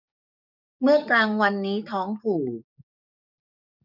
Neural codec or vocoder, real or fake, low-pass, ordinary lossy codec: codec, 44.1 kHz, 7.8 kbps, DAC; fake; 5.4 kHz; none